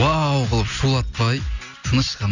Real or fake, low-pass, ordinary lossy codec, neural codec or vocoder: real; 7.2 kHz; none; none